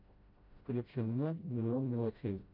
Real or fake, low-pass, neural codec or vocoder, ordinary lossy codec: fake; 5.4 kHz; codec, 16 kHz, 0.5 kbps, FreqCodec, smaller model; AAC, 48 kbps